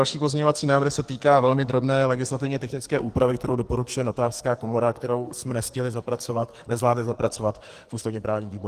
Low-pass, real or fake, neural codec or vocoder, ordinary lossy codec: 14.4 kHz; fake; codec, 44.1 kHz, 2.6 kbps, SNAC; Opus, 24 kbps